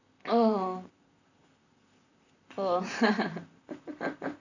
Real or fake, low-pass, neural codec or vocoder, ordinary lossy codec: fake; 7.2 kHz; vocoder, 44.1 kHz, 128 mel bands, Pupu-Vocoder; none